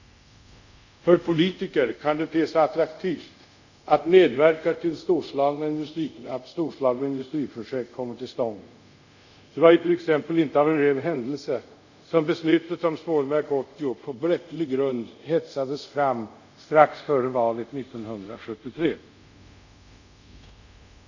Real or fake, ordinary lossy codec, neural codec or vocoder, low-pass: fake; none; codec, 24 kHz, 0.5 kbps, DualCodec; 7.2 kHz